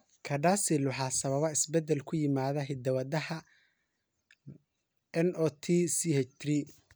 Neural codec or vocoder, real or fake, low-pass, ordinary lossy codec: none; real; none; none